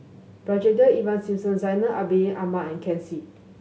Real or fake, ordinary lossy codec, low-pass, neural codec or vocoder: real; none; none; none